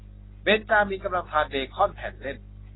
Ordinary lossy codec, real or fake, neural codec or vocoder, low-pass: AAC, 16 kbps; real; none; 7.2 kHz